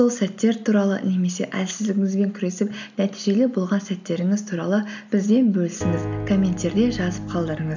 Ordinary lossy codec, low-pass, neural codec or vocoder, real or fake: none; 7.2 kHz; none; real